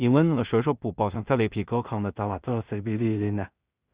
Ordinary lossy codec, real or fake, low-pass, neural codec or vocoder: Opus, 64 kbps; fake; 3.6 kHz; codec, 16 kHz in and 24 kHz out, 0.4 kbps, LongCat-Audio-Codec, two codebook decoder